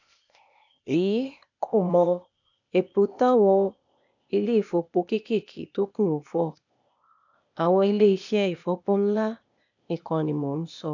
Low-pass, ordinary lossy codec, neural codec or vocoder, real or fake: 7.2 kHz; none; codec, 16 kHz, 0.8 kbps, ZipCodec; fake